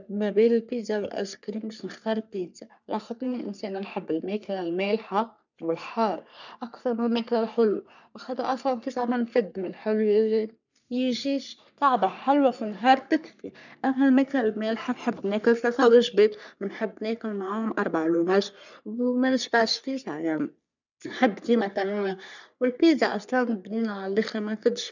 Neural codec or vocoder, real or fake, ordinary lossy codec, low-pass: codec, 44.1 kHz, 3.4 kbps, Pupu-Codec; fake; none; 7.2 kHz